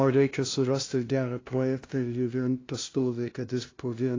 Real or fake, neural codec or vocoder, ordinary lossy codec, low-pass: fake; codec, 16 kHz, 0.5 kbps, FunCodec, trained on LibriTTS, 25 frames a second; AAC, 32 kbps; 7.2 kHz